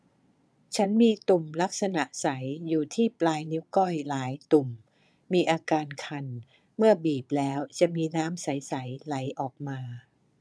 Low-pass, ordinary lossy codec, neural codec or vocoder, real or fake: none; none; vocoder, 22.05 kHz, 80 mel bands, Vocos; fake